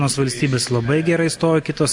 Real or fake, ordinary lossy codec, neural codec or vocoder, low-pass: real; MP3, 64 kbps; none; 10.8 kHz